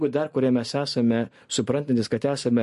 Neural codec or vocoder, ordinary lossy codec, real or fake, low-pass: none; MP3, 48 kbps; real; 14.4 kHz